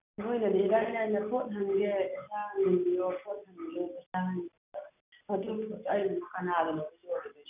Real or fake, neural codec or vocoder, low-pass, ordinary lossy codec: real; none; 3.6 kHz; none